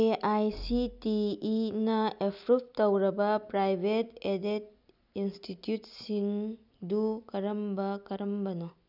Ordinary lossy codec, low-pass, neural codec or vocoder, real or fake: none; 5.4 kHz; none; real